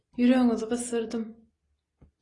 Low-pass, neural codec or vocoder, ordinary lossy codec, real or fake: 10.8 kHz; none; AAC, 32 kbps; real